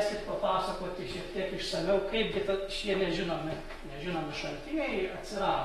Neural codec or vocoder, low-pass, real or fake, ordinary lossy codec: autoencoder, 48 kHz, 128 numbers a frame, DAC-VAE, trained on Japanese speech; 19.8 kHz; fake; AAC, 32 kbps